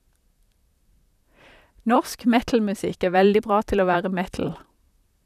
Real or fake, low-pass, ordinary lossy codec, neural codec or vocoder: fake; 14.4 kHz; none; vocoder, 44.1 kHz, 128 mel bands, Pupu-Vocoder